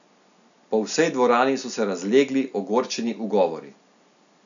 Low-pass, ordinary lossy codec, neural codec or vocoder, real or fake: 7.2 kHz; none; none; real